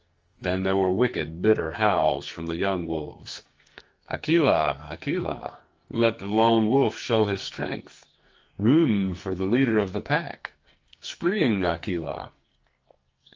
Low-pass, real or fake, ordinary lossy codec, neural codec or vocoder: 7.2 kHz; fake; Opus, 24 kbps; codec, 44.1 kHz, 2.6 kbps, SNAC